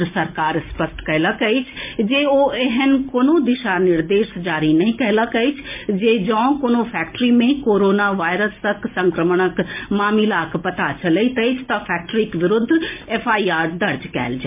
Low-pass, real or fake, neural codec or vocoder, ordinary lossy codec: 3.6 kHz; real; none; MP3, 32 kbps